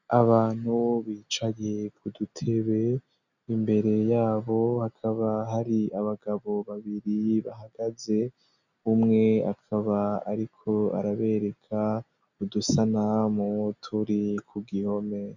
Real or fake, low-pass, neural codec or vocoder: real; 7.2 kHz; none